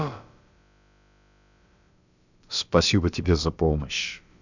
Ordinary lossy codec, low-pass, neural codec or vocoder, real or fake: none; 7.2 kHz; codec, 16 kHz, about 1 kbps, DyCAST, with the encoder's durations; fake